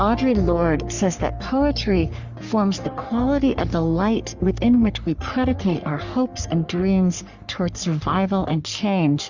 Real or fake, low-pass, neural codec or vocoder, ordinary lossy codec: fake; 7.2 kHz; codec, 44.1 kHz, 3.4 kbps, Pupu-Codec; Opus, 64 kbps